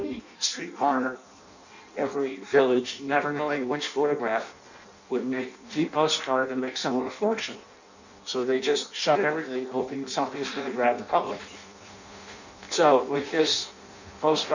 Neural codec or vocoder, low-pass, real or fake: codec, 16 kHz in and 24 kHz out, 0.6 kbps, FireRedTTS-2 codec; 7.2 kHz; fake